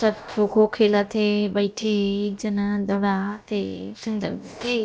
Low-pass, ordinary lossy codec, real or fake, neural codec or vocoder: none; none; fake; codec, 16 kHz, about 1 kbps, DyCAST, with the encoder's durations